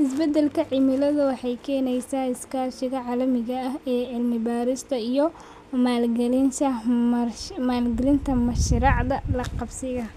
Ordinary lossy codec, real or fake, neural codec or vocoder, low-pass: none; real; none; 14.4 kHz